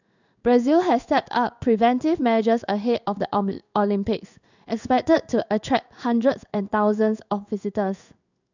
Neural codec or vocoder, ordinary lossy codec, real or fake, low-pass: codec, 16 kHz in and 24 kHz out, 1 kbps, XY-Tokenizer; none; fake; 7.2 kHz